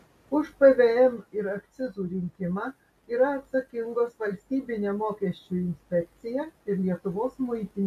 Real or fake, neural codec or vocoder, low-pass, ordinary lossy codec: real; none; 14.4 kHz; MP3, 64 kbps